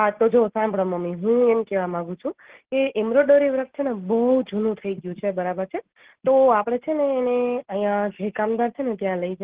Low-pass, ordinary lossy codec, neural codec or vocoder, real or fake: 3.6 kHz; Opus, 16 kbps; none; real